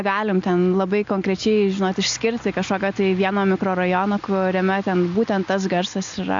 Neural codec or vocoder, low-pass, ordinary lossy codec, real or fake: none; 7.2 kHz; AAC, 64 kbps; real